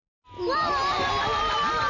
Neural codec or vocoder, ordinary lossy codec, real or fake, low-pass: none; none; real; 7.2 kHz